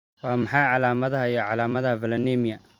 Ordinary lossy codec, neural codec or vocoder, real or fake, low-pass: Opus, 64 kbps; vocoder, 44.1 kHz, 128 mel bands every 256 samples, BigVGAN v2; fake; 19.8 kHz